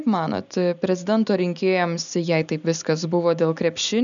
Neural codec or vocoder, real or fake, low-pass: none; real; 7.2 kHz